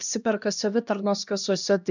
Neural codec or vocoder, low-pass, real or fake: codec, 16 kHz, 1 kbps, X-Codec, HuBERT features, trained on LibriSpeech; 7.2 kHz; fake